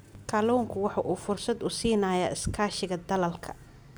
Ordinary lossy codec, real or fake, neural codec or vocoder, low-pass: none; real; none; none